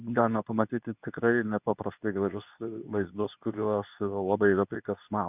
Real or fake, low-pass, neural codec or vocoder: fake; 3.6 kHz; codec, 24 kHz, 0.9 kbps, WavTokenizer, medium speech release version 2